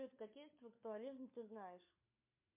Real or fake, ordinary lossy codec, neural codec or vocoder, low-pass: fake; MP3, 32 kbps; codec, 16 kHz, 4 kbps, FunCodec, trained on LibriTTS, 50 frames a second; 3.6 kHz